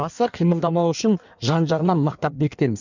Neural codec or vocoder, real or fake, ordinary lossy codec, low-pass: codec, 16 kHz in and 24 kHz out, 1.1 kbps, FireRedTTS-2 codec; fake; none; 7.2 kHz